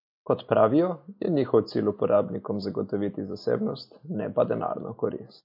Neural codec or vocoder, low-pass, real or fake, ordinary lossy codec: none; 5.4 kHz; real; MP3, 32 kbps